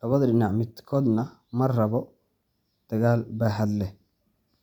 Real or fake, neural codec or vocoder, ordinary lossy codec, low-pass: real; none; none; 19.8 kHz